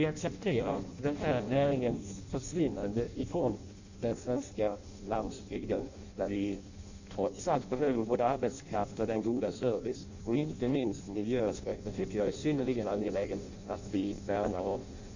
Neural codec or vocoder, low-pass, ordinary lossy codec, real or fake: codec, 16 kHz in and 24 kHz out, 0.6 kbps, FireRedTTS-2 codec; 7.2 kHz; Opus, 64 kbps; fake